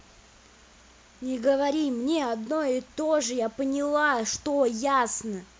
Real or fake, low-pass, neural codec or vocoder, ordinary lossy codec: real; none; none; none